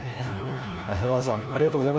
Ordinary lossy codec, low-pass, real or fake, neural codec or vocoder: none; none; fake; codec, 16 kHz, 1 kbps, FunCodec, trained on LibriTTS, 50 frames a second